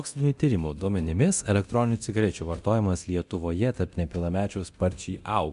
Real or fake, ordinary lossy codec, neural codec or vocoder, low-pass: fake; AAC, 96 kbps; codec, 24 kHz, 0.9 kbps, DualCodec; 10.8 kHz